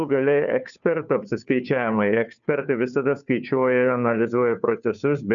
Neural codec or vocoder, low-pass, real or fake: codec, 16 kHz, 2 kbps, FunCodec, trained on Chinese and English, 25 frames a second; 7.2 kHz; fake